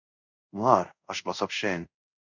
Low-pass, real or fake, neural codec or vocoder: 7.2 kHz; fake; codec, 24 kHz, 0.5 kbps, DualCodec